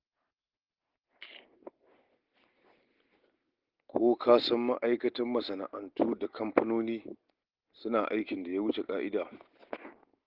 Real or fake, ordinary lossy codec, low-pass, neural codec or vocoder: real; Opus, 16 kbps; 5.4 kHz; none